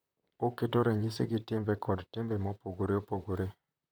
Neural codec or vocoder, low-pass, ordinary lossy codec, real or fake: vocoder, 44.1 kHz, 128 mel bands, Pupu-Vocoder; none; none; fake